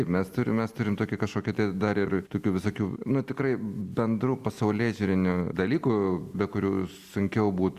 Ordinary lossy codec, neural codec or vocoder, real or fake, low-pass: Opus, 64 kbps; none; real; 14.4 kHz